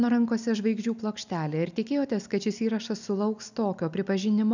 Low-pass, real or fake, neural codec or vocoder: 7.2 kHz; real; none